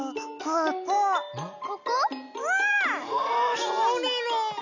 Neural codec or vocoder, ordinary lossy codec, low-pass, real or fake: none; none; 7.2 kHz; real